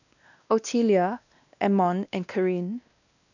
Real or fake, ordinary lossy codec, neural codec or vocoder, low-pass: fake; none; codec, 16 kHz, 1 kbps, X-Codec, WavLM features, trained on Multilingual LibriSpeech; 7.2 kHz